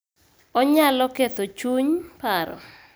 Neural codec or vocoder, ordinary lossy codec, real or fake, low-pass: none; none; real; none